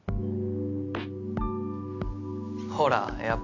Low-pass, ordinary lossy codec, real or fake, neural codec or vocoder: 7.2 kHz; none; real; none